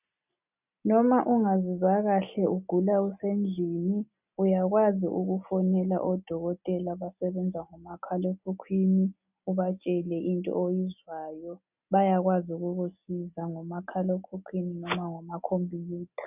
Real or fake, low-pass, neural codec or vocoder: real; 3.6 kHz; none